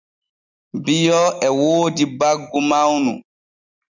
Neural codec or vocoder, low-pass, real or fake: none; 7.2 kHz; real